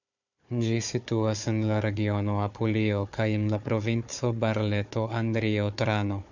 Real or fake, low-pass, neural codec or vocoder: fake; 7.2 kHz; codec, 16 kHz, 4 kbps, FunCodec, trained on Chinese and English, 50 frames a second